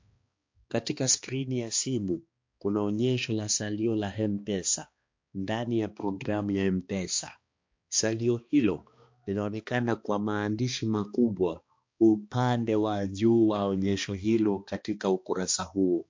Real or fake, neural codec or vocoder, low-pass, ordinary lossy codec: fake; codec, 16 kHz, 2 kbps, X-Codec, HuBERT features, trained on balanced general audio; 7.2 kHz; MP3, 48 kbps